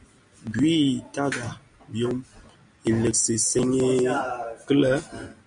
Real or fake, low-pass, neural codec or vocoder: real; 9.9 kHz; none